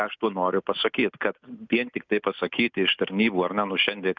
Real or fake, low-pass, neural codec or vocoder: real; 7.2 kHz; none